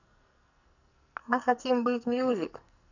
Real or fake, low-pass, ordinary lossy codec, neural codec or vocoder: fake; 7.2 kHz; none; codec, 44.1 kHz, 2.6 kbps, SNAC